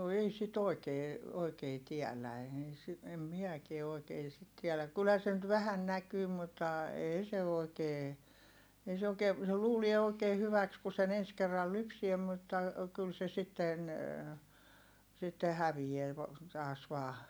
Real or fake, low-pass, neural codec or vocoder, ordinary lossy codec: real; none; none; none